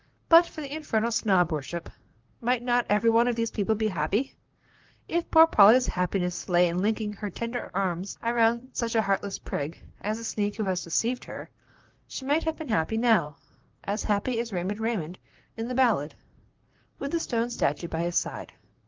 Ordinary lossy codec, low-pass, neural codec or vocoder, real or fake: Opus, 32 kbps; 7.2 kHz; vocoder, 44.1 kHz, 128 mel bands, Pupu-Vocoder; fake